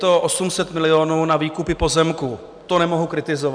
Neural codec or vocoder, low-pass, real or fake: none; 9.9 kHz; real